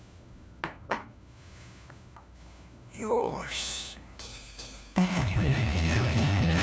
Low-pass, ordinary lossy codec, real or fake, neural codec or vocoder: none; none; fake; codec, 16 kHz, 1 kbps, FunCodec, trained on LibriTTS, 50 frames a second